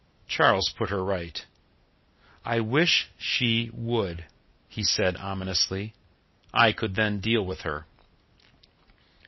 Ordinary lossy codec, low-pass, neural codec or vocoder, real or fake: MP3, 24 kbps; 7.2 kHz; none; real